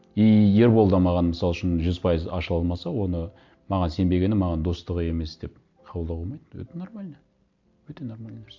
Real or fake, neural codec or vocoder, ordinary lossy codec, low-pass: real; none; MP3, 64 kbps; 7.2 kHz